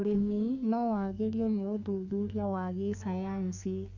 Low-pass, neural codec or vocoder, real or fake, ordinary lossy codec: 7.2 kHz; codec, 32 kHz, 1.9 kbps, SNAC; fake; none